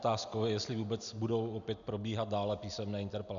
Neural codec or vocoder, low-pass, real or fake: none; 7.2 kHz; real